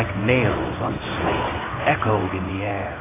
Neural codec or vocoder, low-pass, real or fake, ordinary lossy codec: codec, 16 kHz in and 24 kHz out, 1 kbps, XY-Tokenizer; 3.6 kHz; fake; AAC, 16 kbps